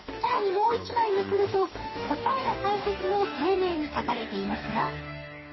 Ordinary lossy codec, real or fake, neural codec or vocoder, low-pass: MP3, 24 kbps; fake; codec, 44.1 kHz, 2.6 kbps, DAC; 7.2 kHz